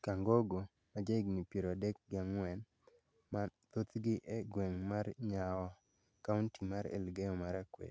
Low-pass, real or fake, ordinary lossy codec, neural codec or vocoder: none; real; none; none